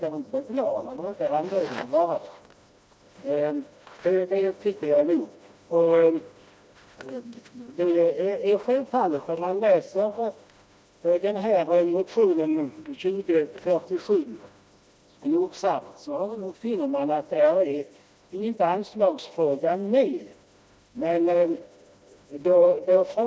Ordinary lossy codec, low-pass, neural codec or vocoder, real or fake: none; none; codec, 16 kHz, 1 kbps, FreqCodec, smaller model; fake